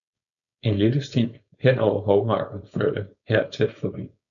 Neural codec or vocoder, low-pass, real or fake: codec, 16 kHz, 4.8 kbps, FACodec; 7.2 kHz; fake